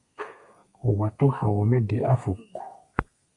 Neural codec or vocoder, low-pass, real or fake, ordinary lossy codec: codec, 32 kHz, 1.9 kbps, SNAC; 10.8 kHz; fake; Opus, 64 kbps